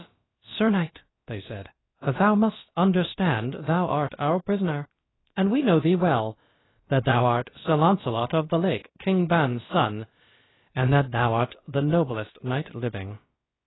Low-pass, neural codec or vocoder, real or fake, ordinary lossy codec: 7.2 kHz; codec, 16 kHz, about 1 kbps, DyCAST, with the encoder's durations; fake; AAC, 16 kbps